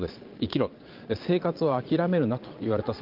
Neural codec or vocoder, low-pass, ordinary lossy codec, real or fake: vocoder, 22.05 kHz, 80 mel bands, Vocos; 5.4 kHz; Opus, 32 kbps; fake